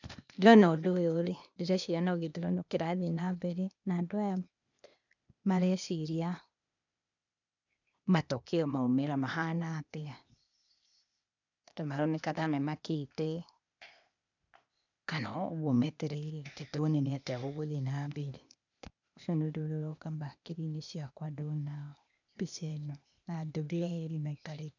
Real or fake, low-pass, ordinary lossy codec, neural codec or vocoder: fake; 7.2 kHz; none; codec, 16 kHz, 0.8 kbps, ZipCodec